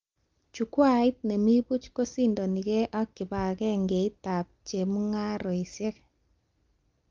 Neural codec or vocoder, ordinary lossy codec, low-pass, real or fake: none; Opus, 32 kbps; 7.2 kHz; real